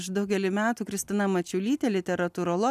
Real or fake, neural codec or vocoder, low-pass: real; none; 14.4 kHz